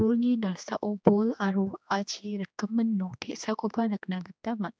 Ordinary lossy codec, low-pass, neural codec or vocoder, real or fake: none; none; codec, 16 kHz, 2 kbps, X-Codec, HuBERT features, trained on general audio; fake